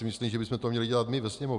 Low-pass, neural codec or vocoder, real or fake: 10.8 kHz; none; real